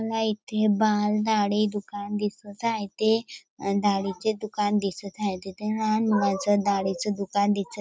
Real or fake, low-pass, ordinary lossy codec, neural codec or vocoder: real; none; none; none